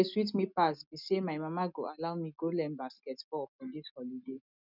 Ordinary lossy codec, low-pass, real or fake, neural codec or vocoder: none; 5.4 kHz; real; none